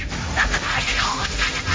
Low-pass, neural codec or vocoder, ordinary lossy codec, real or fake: none; codec, 16 kHz, 1.1 kbps, Voila-Tokenizer; none; fake